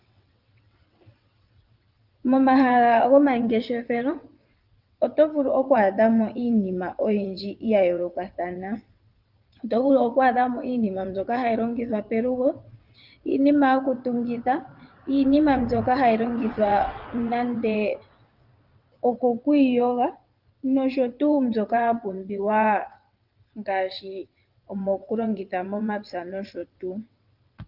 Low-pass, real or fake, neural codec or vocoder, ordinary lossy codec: 5.4 kHz; fake; vocoder, 22.05 kHz, 80 mel bands, WaveNeXt; Opus, 32 kbps